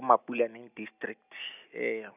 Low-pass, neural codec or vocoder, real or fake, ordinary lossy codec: 3.6 kHz; none; real; none